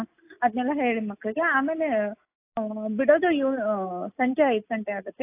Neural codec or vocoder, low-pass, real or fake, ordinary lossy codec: none; 3.6 kHz; real; AAC, 24 kbps